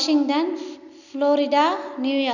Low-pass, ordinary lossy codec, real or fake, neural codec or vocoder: 7.2 kHz; none; real; none